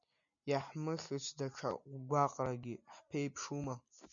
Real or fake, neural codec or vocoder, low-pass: real; none; 7.2 kHz